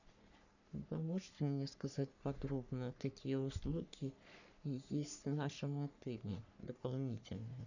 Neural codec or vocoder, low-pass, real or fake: codec, 44.1 kHz, 3.4 kbps, Pupu-Codec; 7.2 kHz; fake